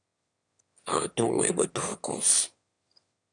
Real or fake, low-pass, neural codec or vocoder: fake; 9.9 kHz; autoencoder, 22.05 kHz, a latent of 192 numbers a frame, VITS, trained on one speaker